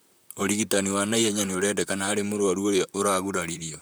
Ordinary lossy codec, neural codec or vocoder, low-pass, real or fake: none; vocoder, 44.1 kHz, 128 mel bands, Pupu-Vocoder; none; fake